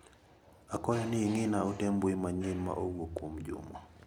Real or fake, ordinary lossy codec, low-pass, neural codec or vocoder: real; none; 19.8 kHz; none